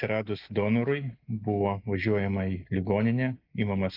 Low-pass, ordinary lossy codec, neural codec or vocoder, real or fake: 5.4 kHz; Opus, 24 kbps; none; real